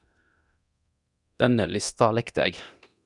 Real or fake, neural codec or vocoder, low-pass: fake; codec, 24 kHz, 0.9 kbps, DualCodec; 10.8 kHz